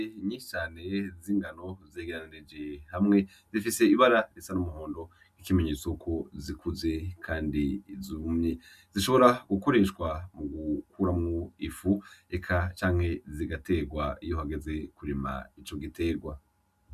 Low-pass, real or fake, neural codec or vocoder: 14.4 kHz; real; none